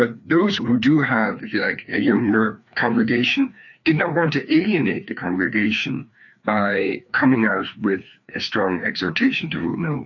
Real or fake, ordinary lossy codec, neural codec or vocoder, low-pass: fake; AAC, 48 kbps; codec, 16 kHz, 2 kbps, FreqCodec, larger model; 7.2 kHz